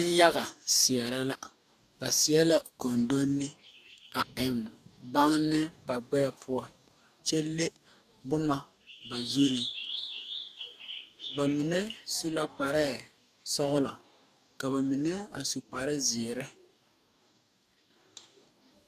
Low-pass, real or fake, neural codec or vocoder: 14.4 kHz; fake; codec, 44.1 kHz, 2.6 kbps, DAC